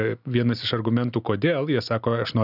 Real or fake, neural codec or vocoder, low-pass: real; none; 5.4 kHz